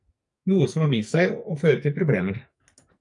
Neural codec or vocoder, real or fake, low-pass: codec, 44.1 kHz, 2.6 kbps, SNAC; fake; 10.8 kHz